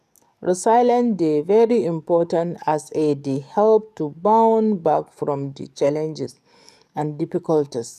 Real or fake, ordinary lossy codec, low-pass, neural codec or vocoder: fake; none; 14.4 kHz; codec, 44.1 kHz, 7.8 kbps, DAC